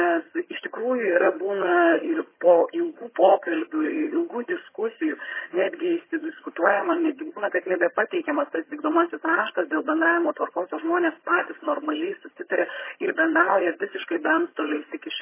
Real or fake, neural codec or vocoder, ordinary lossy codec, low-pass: fake; vocoder, 22.05 kHz, 80 mel bands, HiFi-GAN; MP3, 16 kbps; 3.6 kHz